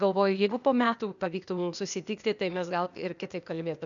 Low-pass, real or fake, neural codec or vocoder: 7.2 kHz; fake; codec, 16 kHz, 0.8 kbps, ZipCodec